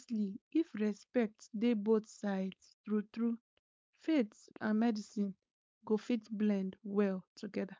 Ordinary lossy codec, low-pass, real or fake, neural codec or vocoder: none; none; fake; codec, 16 kHz, 4.8 kbps, FACodec